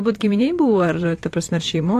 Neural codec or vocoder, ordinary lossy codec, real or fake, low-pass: vocoder, 44.1 kHz, 128 mel bands, Pupu-Vocoder; AAC, 64 kbps; fake; 14.4 kHz